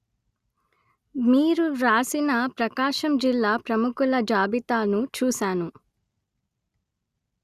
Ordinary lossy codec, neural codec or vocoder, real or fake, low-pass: Opus, 64 kbps; none; real; 14.4 kHz